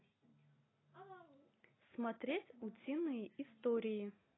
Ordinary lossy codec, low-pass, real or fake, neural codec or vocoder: AAC, 16 kbps; 7.2 kHz; real; none